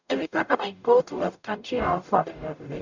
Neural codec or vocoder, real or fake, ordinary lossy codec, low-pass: codec, 44.1 kHz, 0.9 kbps, DAC; fake; none; 7.2 kHz